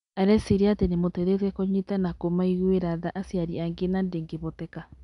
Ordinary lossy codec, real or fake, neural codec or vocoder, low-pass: none; real; none; 10.8 kHz